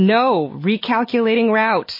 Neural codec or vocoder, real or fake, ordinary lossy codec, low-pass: none; real; MP3, 24 kbps; 5.4 kHz